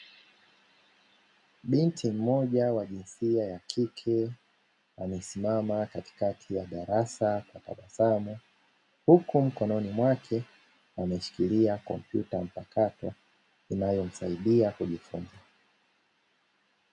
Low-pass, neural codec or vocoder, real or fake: 10.8 kHz; none; real